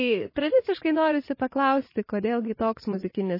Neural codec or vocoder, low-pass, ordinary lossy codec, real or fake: vocoder, 44.1 kHz, 80 mel bands, Vocos; 5.4 kHz; MP3, 24 kbps; fake